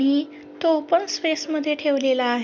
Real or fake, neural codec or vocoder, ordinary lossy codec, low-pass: real; none; none; none